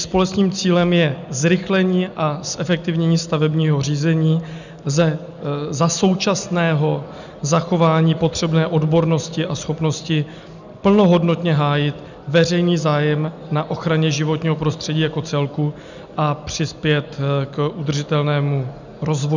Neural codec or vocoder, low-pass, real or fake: none; 7.2 kHz; real